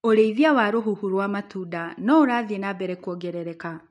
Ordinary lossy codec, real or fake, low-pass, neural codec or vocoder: MP3, 64 kbps; real; 10.8 kHz; none